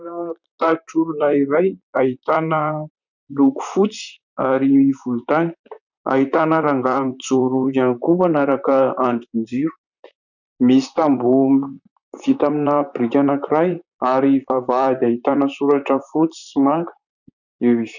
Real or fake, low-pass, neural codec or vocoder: fake; 7.2 kHz; vocoder, 44.1 kHz, 128 mel bands, Pupu-Vocoder